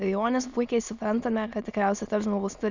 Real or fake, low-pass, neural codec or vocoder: fake; 7.2 kHz; autoencoder, 22.05 kHz, a latent of 192 numbers a frame, VITS, trained on many speakers